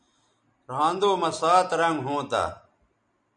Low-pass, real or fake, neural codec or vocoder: 9.9 kHz; real; none